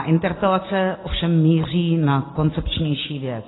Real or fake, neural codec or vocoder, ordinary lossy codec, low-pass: real; none; AAC, 16 kbps; 7.2 kHz